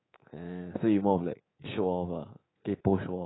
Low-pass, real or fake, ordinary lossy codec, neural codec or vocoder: 7.2 kHz; fake; AAC, 16 kbps; codec, 24 kHz, 3.1 kbps, DualCodec